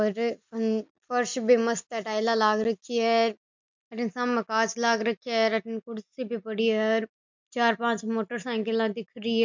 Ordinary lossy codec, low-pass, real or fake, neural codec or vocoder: MP3, 64 kbps; 7.2 kHz; real; none